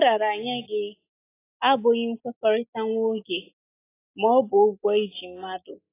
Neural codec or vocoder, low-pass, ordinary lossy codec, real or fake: none; 3.6 kHz; AAC, 16 kbps; real